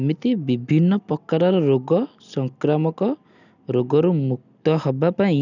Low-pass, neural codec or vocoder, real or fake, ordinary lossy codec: 7.2 kHz; none; real; none